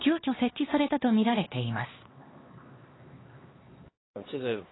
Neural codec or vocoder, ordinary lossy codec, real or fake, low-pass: codec, 16 kHz, 2 kbps, X-Codec, HuBERT features, trained on LibriSpeech; AAC, 16 kbps; fake; 7.2 kHz